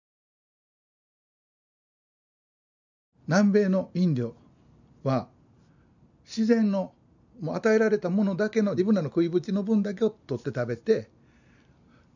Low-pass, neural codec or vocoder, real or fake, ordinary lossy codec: 7.2 kHz; none; real; none